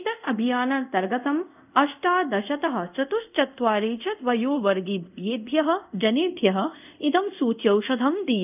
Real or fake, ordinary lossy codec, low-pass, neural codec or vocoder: fake; none; 3.6 kHz; codec, 24 kHz, 0.5 kbps, DualCodec